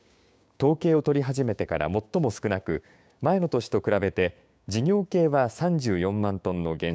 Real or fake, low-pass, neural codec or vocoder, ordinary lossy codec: fake; none; codec, 16 kHz, 6 kbps, DAC; none